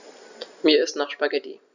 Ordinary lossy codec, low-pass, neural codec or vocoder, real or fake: none; 7.2 kHz; none; real